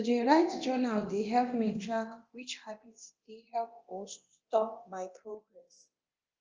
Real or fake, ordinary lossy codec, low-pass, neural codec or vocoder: fake; Opus, 24 kbps; 7.2 kHz; codec, 24 kHz, 0.9 kbps, DualCodec